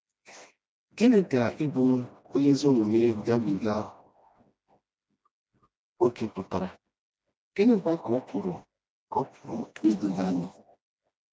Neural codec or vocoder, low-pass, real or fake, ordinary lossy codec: codec, 16 kHz, 1 kbps, FreqCodec, smaller model; none; fake; none